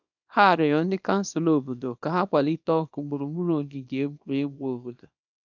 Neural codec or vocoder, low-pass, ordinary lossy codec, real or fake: codec, 24 kHz, 0.9 kbps, WavTokenizer, small release; 7.2 kHz; none; fake